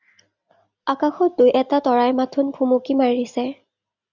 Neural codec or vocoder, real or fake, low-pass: none; real; 7.2 kHz